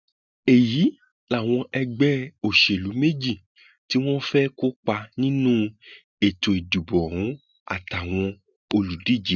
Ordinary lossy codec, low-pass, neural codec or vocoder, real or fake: none; 7.2 kHz; none; real